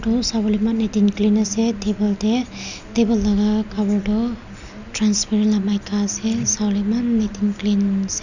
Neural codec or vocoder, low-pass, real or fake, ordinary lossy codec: none; 7.2 kHz; real; none